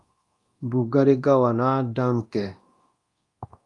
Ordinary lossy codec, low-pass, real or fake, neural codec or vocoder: Opus, 32 kbps; 10.8 kHz; fake; codec, 24 kHz, 0.9 kbps, DualCodec